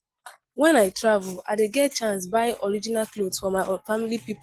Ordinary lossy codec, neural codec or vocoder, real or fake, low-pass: Opus, 16 kbps; none; real; 14.4 kHz